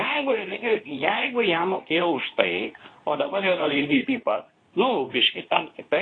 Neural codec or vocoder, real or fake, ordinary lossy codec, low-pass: codec, 24 kHz, 0.9 kbps, WavTokenizer, medium speech release version 2; fake; AAC, 32 kbps; 9.9 kHz